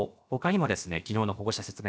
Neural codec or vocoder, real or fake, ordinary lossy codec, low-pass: codec, 16 kHz, about 1 kbps, DyCAST, with the encoder's durations; fake; none; none